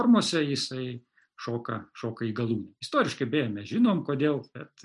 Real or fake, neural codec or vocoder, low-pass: real; none; 10.8 kHz